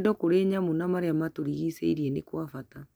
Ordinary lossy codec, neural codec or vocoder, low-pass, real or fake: none; none; none; real